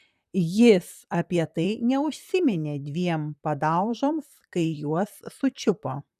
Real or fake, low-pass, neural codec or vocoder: fake; 14.4 kHz; vocoder, 44.1 kHz, 128 mel bands, Pupu-Vocoder